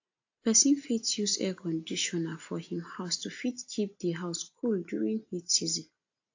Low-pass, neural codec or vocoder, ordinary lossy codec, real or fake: 7.2 kHz; none; AAC, 48 kbps; real